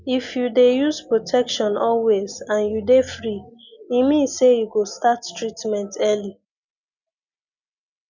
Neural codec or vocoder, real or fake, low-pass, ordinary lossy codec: none; real; 7.2 kHz; none